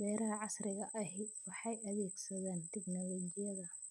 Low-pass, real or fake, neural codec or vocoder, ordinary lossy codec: none; real; none; none